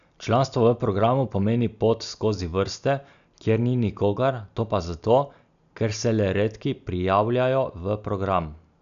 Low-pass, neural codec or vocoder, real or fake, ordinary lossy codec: 7.2 kHz; none; real; none